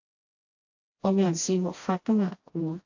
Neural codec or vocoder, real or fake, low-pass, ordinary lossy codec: codec, 16 kHz, 1 kbps, FreqCodec, smaller model; fake; 7.2 kHz; AAC, 48 kbps